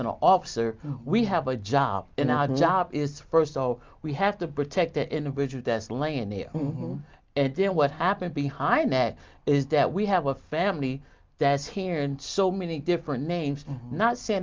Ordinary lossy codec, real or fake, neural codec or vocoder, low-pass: Opus, 24 kbps; real; none; 7.2 kHz